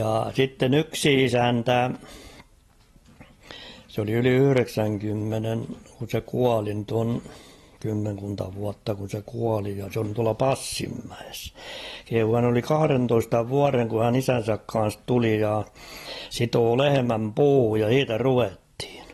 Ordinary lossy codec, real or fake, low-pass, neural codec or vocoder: AAC, 32 kbps; real; 19.8 kHz; none